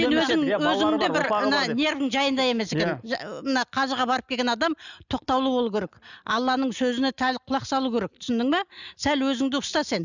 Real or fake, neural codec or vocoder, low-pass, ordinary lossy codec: real; none; 7.2 kHz; none